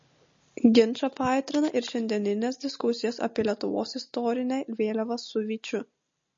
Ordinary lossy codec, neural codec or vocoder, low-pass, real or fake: MP3, 32 kbps; none; 7.2 kHz; real